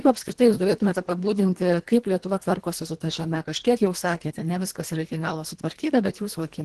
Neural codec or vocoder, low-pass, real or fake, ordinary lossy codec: codec, 24 kHz, 1.5 kbps, HILCodec; 10.8 kHz; fake; Opus, 16 kbps